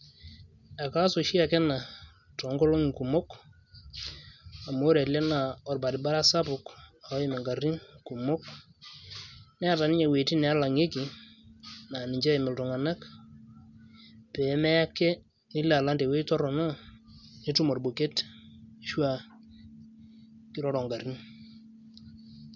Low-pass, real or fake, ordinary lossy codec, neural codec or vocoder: 7.2 kHz; real; none; none